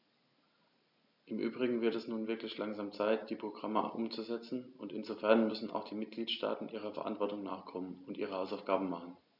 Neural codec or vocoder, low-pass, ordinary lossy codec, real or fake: none; 5.4 kHz; none; real